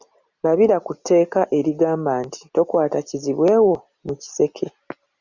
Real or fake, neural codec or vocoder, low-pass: real; none; 7.2 kHz